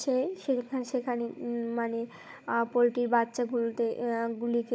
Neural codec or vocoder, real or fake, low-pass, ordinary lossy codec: codec, 16 kHz, 16 kbps, FunCodec, trained on Chinese and English, 50 frames a second; fake; none; none